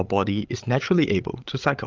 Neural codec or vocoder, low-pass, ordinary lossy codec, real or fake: codec, 16 kHz, 16 kbps, FreqCodec, larger model; 7.2 kHz; Opus, 32 kbps; fake